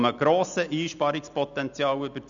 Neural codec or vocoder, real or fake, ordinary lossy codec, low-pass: none; real; none; 7.2 kHz